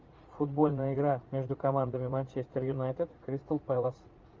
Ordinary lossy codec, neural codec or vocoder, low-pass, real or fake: Opus, 32 kbps; vocoder, 44.1 kHz, 80 mel bands, Vocos; 7.2 kHz; fake